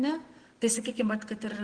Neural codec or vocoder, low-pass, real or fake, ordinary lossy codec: none; 9.9 kHz; real; Opus, 16 kbps